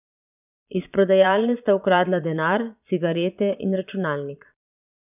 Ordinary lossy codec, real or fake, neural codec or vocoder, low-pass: none; fake; vocoder, 22.05 kHz, 80 mel bands, Vocos; 3.6 kHz